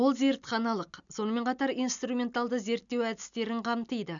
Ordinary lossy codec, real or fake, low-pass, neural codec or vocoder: none; real; 7.2 kHz; none